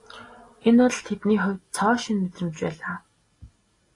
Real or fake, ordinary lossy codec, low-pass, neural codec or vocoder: real; AAC, 32 kbps; 10.8 kHz; none